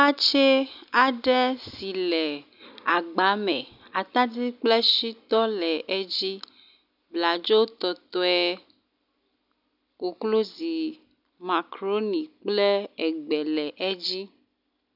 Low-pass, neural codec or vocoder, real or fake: 5.4 kHz; none; real